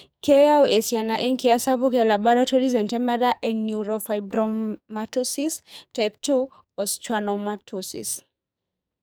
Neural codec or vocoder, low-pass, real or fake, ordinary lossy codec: codec, 44.1 kHz, 2.6 kbps, SNAC; none; fake; none